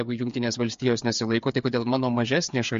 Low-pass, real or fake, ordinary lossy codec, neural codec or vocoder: 7.2 kHz; fake; MP3, 48 kbps; codec, 16 kHz, 8 kbps, FreqCodec, smaller model